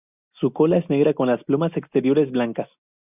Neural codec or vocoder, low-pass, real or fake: none; 3.6 kHz; real